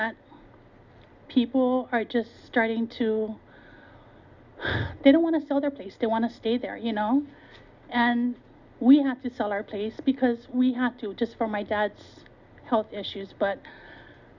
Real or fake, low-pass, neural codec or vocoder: real; 7.2 kHz; none